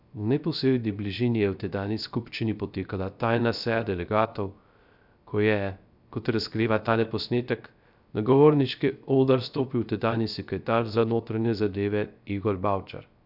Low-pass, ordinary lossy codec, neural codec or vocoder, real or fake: 5.4 kHz; none; codec, 16 kHz, 0.3 kbps, FocalCodec; fake